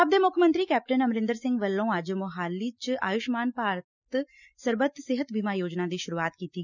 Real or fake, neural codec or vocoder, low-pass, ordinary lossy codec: real; none; 7.2 kHz; none